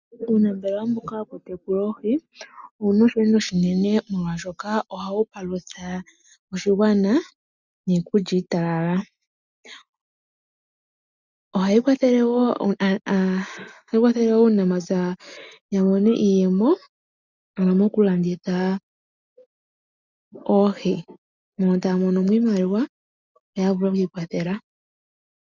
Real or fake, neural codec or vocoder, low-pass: real; none; 7.2 kHz